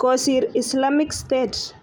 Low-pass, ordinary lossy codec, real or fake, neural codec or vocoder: 19.8 kHz; none; real; none